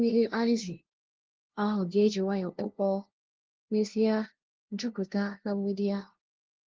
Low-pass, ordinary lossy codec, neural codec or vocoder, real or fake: 7.2 kHz; Opus, 16 kbps; codec, 16 kHz, 0.5 kbps, FunCodec, trained on LibriTTS, 25 frames a second; fake